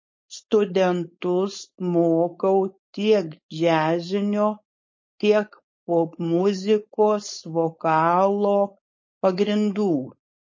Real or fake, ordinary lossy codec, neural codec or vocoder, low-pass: fake; MP3, 32 kbps; codec, 16 kHz, 4.8 kbps, FACodec; 7.2 kHz